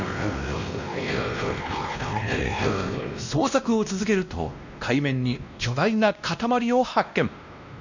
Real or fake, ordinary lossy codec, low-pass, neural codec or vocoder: fake; none; 7.2 kHz; codec, 16 kHz, 1 kbps, X-Codec, WavLM features, trained on Multilingual LibriSpeech